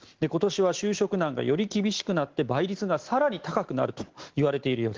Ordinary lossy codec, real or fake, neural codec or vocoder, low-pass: Opus, 16 kbps; real; none; 7.2 kHz